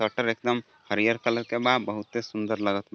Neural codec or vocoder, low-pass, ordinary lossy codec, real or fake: none; none; none; real